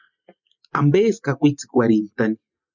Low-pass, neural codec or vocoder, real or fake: 7.2 kHz; none; real